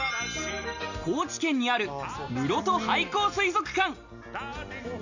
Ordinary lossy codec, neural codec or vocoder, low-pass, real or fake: none; none; 7.2 kHz; real